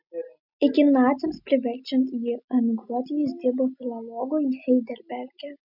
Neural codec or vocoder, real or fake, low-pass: none; real; 5.4 kHz